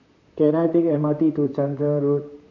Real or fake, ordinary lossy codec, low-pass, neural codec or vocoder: fake; none; 7.2 kHz; vocoder, 44.1 kHz, 128 mel bands, Pupu-Vocoder